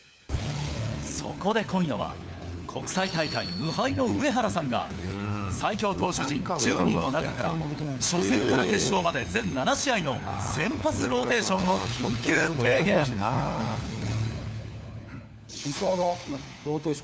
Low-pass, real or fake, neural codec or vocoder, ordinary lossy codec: none; fake; codec, 16 kHz, 4 kbps, FunCodec, trained on LibriTTS, 50 frames a second; none